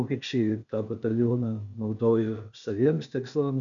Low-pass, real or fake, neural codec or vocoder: 7.2 kHz; fake; codec, 16 kHz, 0.8 kbps, ZipCodec